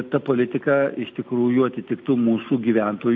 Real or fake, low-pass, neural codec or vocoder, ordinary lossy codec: real; 7.2 kHz; none; MP3, 48 kbps